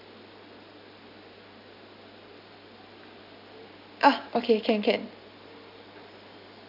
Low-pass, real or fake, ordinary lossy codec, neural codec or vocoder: 5.4 kHz; real; AAC, 48 kbps; none